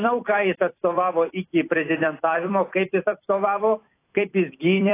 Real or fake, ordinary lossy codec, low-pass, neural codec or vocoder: fake; AAC, 24 kbps; 3.6 kHz; vocoder, 44.1 kHz, 128 mel bands every 256 samples, BigVGAN v2